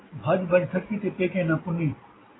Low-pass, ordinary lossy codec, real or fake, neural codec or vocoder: 7.2 kHz; AAC, 16 kbps; real; none